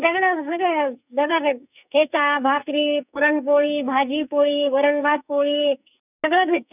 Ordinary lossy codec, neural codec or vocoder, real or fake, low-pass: none; codec, 44.1 kHz, 2.6 kbps, SNAC; fake; 3.6 kHz